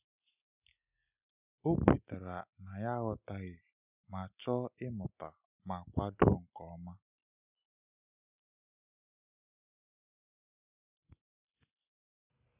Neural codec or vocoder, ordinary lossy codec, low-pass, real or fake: none; none; 3.6 kHz; real